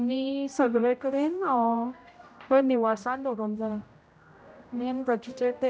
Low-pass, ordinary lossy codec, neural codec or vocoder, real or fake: none; none; codec, 16 kHz, 0.5 kbps, X-Codec, HuBERT features, trained on general audio; fake